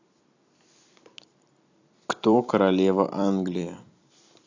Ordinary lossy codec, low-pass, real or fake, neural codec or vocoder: AAC, 48 kbps; 7.2 kHz; fake; vocoder, 44.1 kHz, 128 mel bands every 256 samples, BigVGAN v2